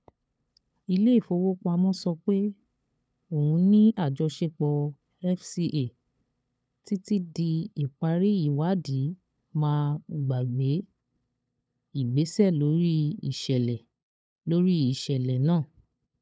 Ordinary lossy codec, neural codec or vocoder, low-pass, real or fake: none; codec, 16 kHz, 8 kbps, FunCodec, trained on LibriTTS, 25 frames a second; none; fake